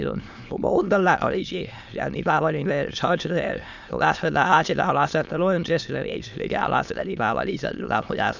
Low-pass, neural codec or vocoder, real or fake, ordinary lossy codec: 7.2 kHz; autoencoder, 22.05 kHz, a latent of 192 numbers a frame, VITS, trained on many speakers; fake; none